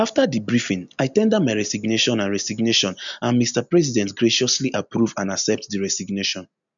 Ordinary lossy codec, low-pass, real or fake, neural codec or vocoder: none; 7.2 kHz; real; none